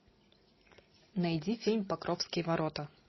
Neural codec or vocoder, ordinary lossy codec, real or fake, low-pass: none; MP3, 24 kbps; real; 7.2 kHz